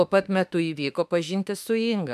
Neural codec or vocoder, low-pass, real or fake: autoencoder, 48 kHz, 32 numbers a frame, DAC-VAE, trained on Japanese speech; 14.4 kHz; fake